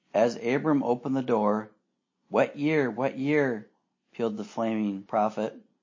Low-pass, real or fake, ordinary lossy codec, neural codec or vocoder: 7.2 kHz; real; MP3, 32 kbps; none